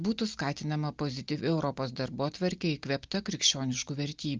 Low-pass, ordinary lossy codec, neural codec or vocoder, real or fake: 7.2 kHz; Opus, 24 kbps; none; real